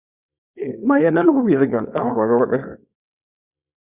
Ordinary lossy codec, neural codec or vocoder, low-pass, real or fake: Opus, 64 kbps; codec, 24 kHz, 0.9 kbps, WavTokenizer, small release; 3.6 kHz; fake